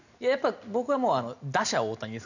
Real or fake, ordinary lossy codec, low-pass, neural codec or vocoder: real; none; 7.2 kHz; none